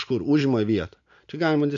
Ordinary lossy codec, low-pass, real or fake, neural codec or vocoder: MP3, 64 kbps; 7.2 kHz; real; none